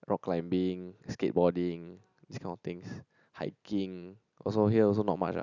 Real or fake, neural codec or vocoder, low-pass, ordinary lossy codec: real; none; 7.2 kHz; none